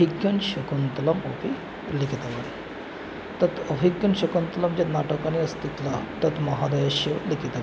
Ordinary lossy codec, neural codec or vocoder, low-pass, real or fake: none; none; none; real